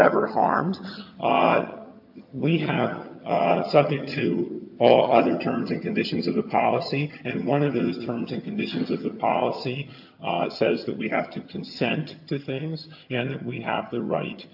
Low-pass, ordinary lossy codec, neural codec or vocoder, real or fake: 5.4 kHz; AAC, 48 kbps; vocoder, 22.05 kHz, 80 mel bands, HiFi-GAN; fake